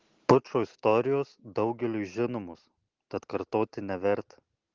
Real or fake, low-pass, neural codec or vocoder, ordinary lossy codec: real; 7.2 kHz; none; Opus, 16 kbps